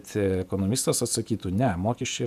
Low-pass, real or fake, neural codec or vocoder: 14.4 kHz; fake; vocoder, 48 kHz, 128 mel bands, Vocos